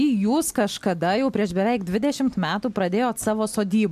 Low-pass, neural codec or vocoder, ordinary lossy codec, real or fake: 14.4 kHz; none; MP3, 96 kbps; real